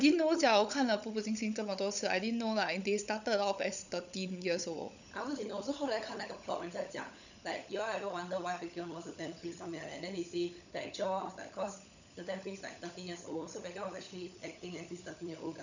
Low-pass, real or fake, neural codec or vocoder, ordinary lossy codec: 7.2 kHz; fake; codec, 16 kHz, 16 kbps, FunCodec, trained on LibriTTS, 50 frames a second; none